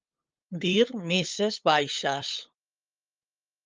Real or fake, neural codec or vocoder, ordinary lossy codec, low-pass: fake; codec, 16 kHz, 8 kbps, FunCodec, trained on LibriTTS, 25 frames a second; Opus, 24 kbps; 7.2 kHz